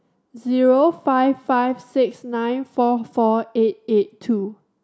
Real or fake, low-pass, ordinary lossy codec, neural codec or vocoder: real; none; none; none